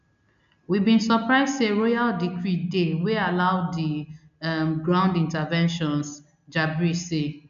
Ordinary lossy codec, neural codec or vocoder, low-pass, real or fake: none; none; 7.2 kHz; real